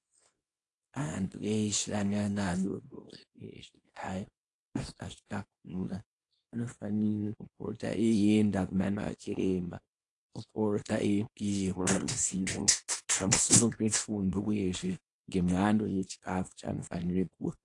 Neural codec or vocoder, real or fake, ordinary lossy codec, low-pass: codec, 24 kHz, 0.9 kbps, WavTokenizer, small release; fake; AAC, 48 kbps; 10.8 kHz